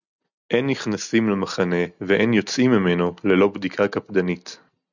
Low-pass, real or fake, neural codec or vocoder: 7.2 kHz; real; none